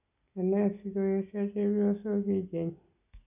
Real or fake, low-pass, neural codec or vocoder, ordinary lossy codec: real; 3.6 kHz; none; none